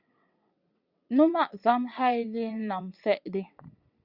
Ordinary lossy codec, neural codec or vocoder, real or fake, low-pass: Opus, 64 kbps; vocoder, 22.05 kHz, 80 mel bands, WaveNeXt; fake; 5.4 kHz